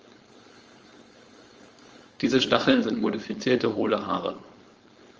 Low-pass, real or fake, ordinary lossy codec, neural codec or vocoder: 7.2 kHz; fake; Opus, 24 kbps; codec, 16 kHz, 4.8 kbps, FACodec